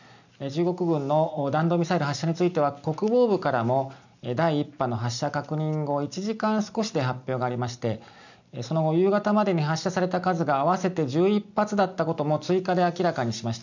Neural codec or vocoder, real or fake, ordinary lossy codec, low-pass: none; real; none; 7.2 kHz